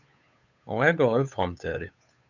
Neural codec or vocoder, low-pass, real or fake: codec, 16 kHz, 8 kbps, FunCodec, trained on Chinese and English, 25 frames a second; 7.2 kHz; fake